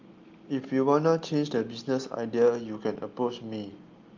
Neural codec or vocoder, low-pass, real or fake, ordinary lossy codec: none; 7.2 kHz; real; Opus, 32 kbps